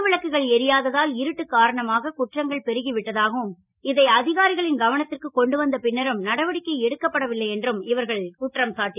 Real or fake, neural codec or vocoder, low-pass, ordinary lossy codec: real; none; 3.6 kHz; none